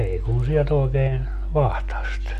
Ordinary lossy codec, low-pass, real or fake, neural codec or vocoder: none; 14.4 kHz; real; none